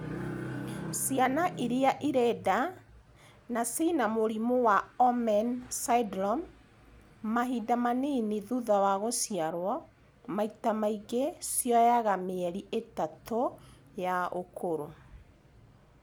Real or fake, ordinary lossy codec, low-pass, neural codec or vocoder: real; none; none; none